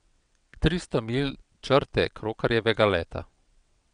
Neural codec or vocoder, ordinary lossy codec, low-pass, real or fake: vocoder, 22.05 kHz, 80 mel bands, WaveNeXt; none; 9.9 kHz; fake